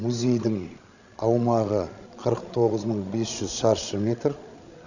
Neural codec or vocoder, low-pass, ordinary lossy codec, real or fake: codec, 16 kHz, 16 kbps, FreqCodec, larger model; 7.2 kHz; none; fake